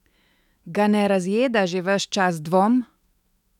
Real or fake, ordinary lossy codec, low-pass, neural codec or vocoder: fake; none; 19.8 kHz; autoencoder, 48 kHz, 128 numbers a frame, DAC-VAE, trained on Japanese speech